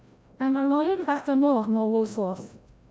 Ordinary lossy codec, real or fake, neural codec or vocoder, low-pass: none; fake; codec, 16 kHz, 0.5 kbps, FreqCodec, larger model; none